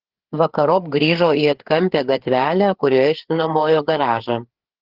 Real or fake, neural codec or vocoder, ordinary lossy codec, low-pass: fake; codec, 16 kHz, 4 kbps, FreqCodec, larger model; Opus, 16 kbps; 5.4 kHz